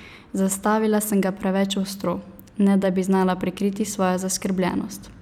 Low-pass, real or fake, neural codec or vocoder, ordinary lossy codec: 19.8 kHz; real; none; none